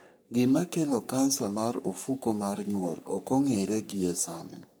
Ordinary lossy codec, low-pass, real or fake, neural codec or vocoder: none; none; fake; codec, 44.1 kHz, 3.4 kbps, Pupu-Codec